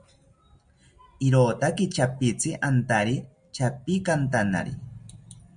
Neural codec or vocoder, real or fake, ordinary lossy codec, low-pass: none; real; MP3, 96 kbps; 9.9 kHz